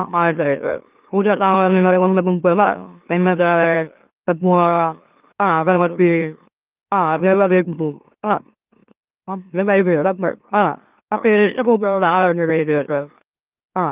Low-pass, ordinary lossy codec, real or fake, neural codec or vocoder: 3.6 kHz; Opus, 24 kbps; fake; autoencoder, 44.1 kHz, a latent of 192 numbers a frame, MeloTTS